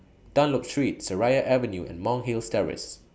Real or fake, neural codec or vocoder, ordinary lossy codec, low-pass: real; none; none; none